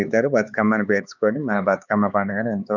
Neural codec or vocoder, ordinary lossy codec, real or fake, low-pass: codec, 16 kHz, 4 kbps, X-Codec, HuBERT features, trained on LibriSpeech; none; fake; 7.2 kHz